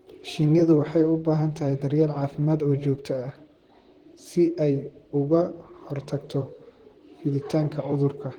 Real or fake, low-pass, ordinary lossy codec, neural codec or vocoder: fake; 19.8 kHz; Opus, 16 kbps; vocoder, 44.1 kHz, 128 mel bands, Pupu-Vocoder